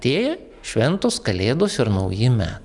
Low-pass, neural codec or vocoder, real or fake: 10.8 kHz; none; real